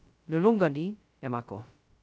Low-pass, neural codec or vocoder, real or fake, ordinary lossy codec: none; codec, 16 kHz, 0.2 kbps, FocalCodec; fake; none